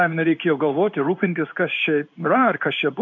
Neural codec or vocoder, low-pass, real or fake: codec, 16 kHz in and 24 kHz out, 1 kbps, XY-Tokenizer; 7.2 kHz; fake